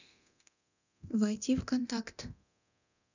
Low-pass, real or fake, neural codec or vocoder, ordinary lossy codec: 7.2 kHz; fake; codec, 24 kHz, 0.9 kbps, DualCodec; none